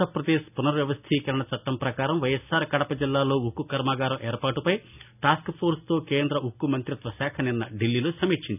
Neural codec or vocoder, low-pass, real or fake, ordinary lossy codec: none; 3.6 kHz; real; none